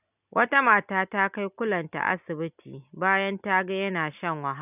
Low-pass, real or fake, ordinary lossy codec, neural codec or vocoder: 3.6 kHz; real; none; none